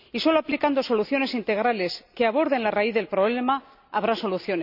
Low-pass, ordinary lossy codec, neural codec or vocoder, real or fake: 5.4 kHz; none; none; real